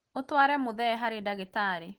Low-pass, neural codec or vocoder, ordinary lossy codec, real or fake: 19.8 kHz; none; Opus, 16 kbps; real